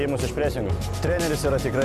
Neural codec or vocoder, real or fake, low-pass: none; real; 14.4 kHz